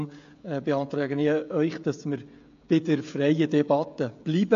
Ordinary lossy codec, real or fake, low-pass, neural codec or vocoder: AAC, 48 kbps; fake; 7.2 kHz; codec, 16 kHz, 16 kbps, FreqCodec, smaller model